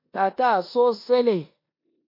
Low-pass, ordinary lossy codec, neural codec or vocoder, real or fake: 5.4 kHz; MP3, 32 kbps; codec, 16 kHz in and 24 kHz out, 0.9 kbps, LongCat-Audio-Codec, four codebook decoder; fake